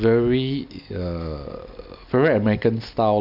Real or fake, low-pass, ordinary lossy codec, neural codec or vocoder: real; 5.4 kHz; none; none